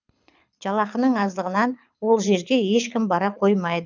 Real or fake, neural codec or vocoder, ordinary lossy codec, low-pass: fake; codec, 24 kHz, 6 kbps, HILCodec; none; 7.2 kHz